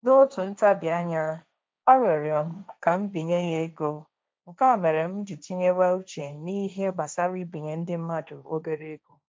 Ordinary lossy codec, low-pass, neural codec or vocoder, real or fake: none; none; codec, 16 kHz, 1.1 kbps, Voila-Tokenizer; fake